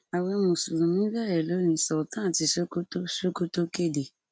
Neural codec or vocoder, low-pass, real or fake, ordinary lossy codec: none; none; real; none